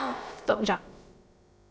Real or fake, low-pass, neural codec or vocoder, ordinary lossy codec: fake; none; codec, 16 kHz, about 1 kbps, DyCAST, with the encoder's durations; none